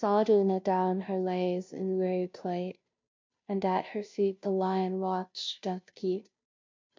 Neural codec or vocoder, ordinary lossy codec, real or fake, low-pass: codec, 16 kHz, 0.5 kbps, FunCodec, trained on Chinese and English, 25 frames a second; MP3, 48 kbps; fake; 7.2 kHz